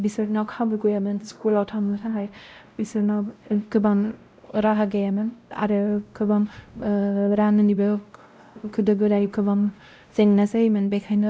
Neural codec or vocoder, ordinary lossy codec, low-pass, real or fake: codec, 16 kHz, 0.5 kbps, X-Codec, WavLM features, trained on Multilingual LibriSpeech; none; none; fake